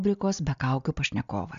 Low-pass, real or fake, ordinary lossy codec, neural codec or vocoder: 7.2 kHz; real; AAC, 96 kbps; none